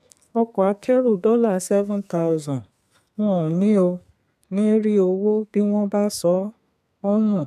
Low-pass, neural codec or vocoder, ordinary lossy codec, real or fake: 14.4 kHz; codec, 32 kHz, 1.9 kbps, SNAC; none; fake